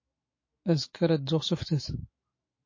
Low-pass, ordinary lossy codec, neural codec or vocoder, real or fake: 7.2 kHz; MP3, 32 kbps; autoencoder, 48 kHz, 128 numbers a frame, DAC-VAE, trained on Japanese speech; fake